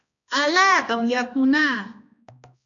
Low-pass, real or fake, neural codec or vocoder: 7.2 kHz; fake; codec, 16 kHz, 1 kbps, X-Codec, HuBERT features, trained on balanced general audio